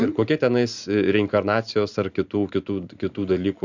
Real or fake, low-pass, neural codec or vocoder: fake; 7.2 kHz; vocoder, 44.1 kHz, 128 mel bands every 512 samples, BigVGAN v2